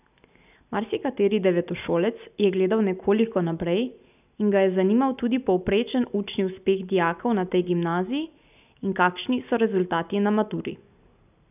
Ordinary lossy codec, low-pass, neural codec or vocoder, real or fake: none; 3.6 kHz; none; real